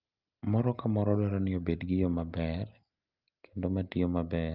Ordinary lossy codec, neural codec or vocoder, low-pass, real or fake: Opus, 32 kbps; none; 5.4 kHz; real